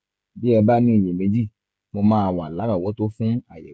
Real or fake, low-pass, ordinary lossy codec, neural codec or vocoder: fake; none; none; codec, 16 kHz, 16 kbps, FreqCodec, smaller model